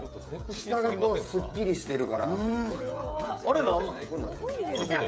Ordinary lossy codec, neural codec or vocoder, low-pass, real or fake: none; codec, 16 kHz, 16 kbps, FreqCodec, smaller model; none; fake